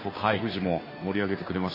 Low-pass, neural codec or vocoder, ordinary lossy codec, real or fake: 5.4 kHz; codec, 16 kHz, 2 kbps, FunCodec, trained on Chinese and English, 25 frames a second; MP3, 24 kbps; fake